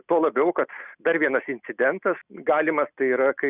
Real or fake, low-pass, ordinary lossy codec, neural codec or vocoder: real; 3.6 kHz; Opus, 64 kbps; none